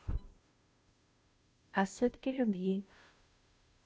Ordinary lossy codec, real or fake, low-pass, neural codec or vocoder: none; fake; none; codec, 16 kHz, 0.5 kbps, FunCodec, trained on Chinese and English, 25 frames a second